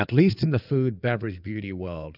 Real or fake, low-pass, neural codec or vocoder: fake; 5.4 kHz; codec, 16 kHz in and 24 kHz out, 2.2 kbps, FireRedTTS-2 codec